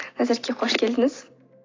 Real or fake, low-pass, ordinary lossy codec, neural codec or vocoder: real; 7.2 kHz; AAC, 32 kbps; none